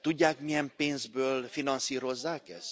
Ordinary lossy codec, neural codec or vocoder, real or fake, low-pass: none; none; real; none